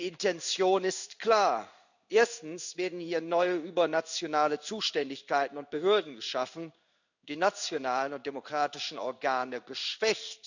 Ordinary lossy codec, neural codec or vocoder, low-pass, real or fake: none; codec, 16 kHz in and 24 kHz out, 1 kbps, XY-Tokenizer; 7.2 kHz; fake